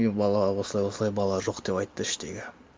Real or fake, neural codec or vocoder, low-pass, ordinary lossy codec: real; none; none; none